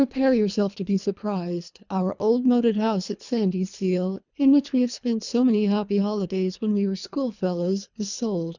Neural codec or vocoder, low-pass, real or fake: codec, 24 kHz, 3 kbps, HILCodec; 7.2 kHz; fake